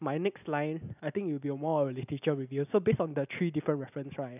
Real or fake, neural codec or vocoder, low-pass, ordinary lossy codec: real; none; 3.6 kHz; none